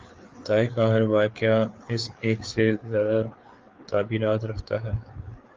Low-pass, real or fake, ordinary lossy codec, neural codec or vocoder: 7.2 kHz; fake; Opus, 32 kbps; codec, 16 kHz, 4 kbps, FreqCodec, larger model